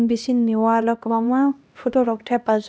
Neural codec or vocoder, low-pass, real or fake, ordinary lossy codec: codec, 16 kHz, 1 kbps, X-Codec, HuBERT features, trained on LibriSpeech; none; fake; none